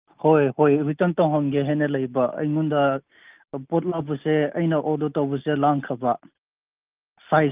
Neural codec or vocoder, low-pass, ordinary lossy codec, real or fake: none; 3.6 kHz; Opus, 24 kbps; real